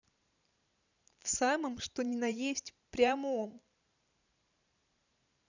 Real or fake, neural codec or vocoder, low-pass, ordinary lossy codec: fake; vocoder, 44.1 kHz, 128 mel bands every 512 samples, BigVGAN v2; 7.2 kHz; none